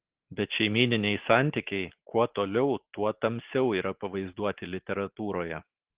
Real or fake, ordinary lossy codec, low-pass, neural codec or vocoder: real; Opus, 16 kbps; 3.6 kHz; none